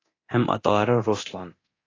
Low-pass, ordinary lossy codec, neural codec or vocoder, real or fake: 7.2 kHz; AAC, 32 kbps; codec, 24 kHz, 0.9 kbps, DualCodec; fake